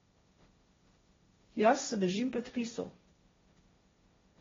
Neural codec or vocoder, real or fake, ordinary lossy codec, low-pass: codec, 16 kHz, 1.1 kbps, Voila-Tokenizer; fake; AAC, 24 kbps; 7.2 kHz